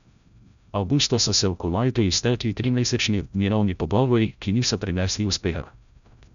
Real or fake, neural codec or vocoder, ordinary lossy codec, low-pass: fake; codec, 16 kHz, 0.5 kbps, FreqCodec, larger model; none; 7.2 kHz